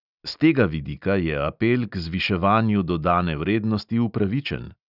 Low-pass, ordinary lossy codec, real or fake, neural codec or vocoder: 5.4 kHz; none; real; none